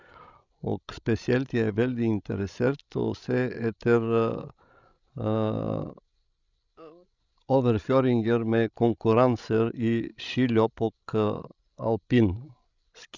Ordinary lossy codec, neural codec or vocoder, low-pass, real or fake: none; codec, 16 kHz, 16 kbps, FreqCodec, larger model; 7.2 kHz; fake